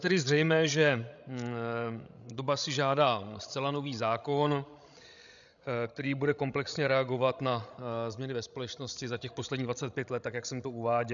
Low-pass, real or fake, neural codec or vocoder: 7.2 kHz; fake; codec, 16 kHz, 16 kbps, FreqCodec, larger model